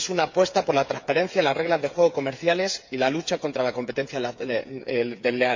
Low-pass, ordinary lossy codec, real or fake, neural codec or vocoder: 7.2 kHz; MP3, 64 kbps; fake; codec, 16 kHz, 8 kbps, FreqCodec, smaller model